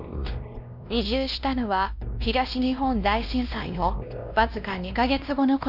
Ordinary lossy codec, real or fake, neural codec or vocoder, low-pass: none; fake; codec, 16 kHz, 1 kbps, X-Codec, WavLM features, trained on Multilingual LibriSpeech; 5.4 kHz